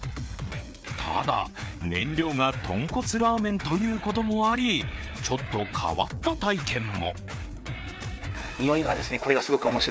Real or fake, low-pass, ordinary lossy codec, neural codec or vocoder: fake; none; none; codec, 16 kHz, 4 kbps, FreqCodec, larger model